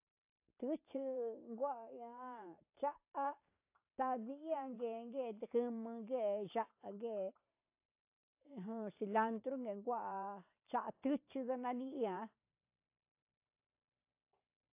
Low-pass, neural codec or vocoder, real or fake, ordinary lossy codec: 3.6 kHz; vocoder, 44.1 kHz, 128 mel bands every 512 samples, BigVGAN v2; fake; AAC, 32 kbps